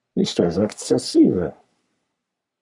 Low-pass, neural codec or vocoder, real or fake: 10.8 kHz; codec, 44.1 kHz, 3.4 kbps, Pupu-Codec; fake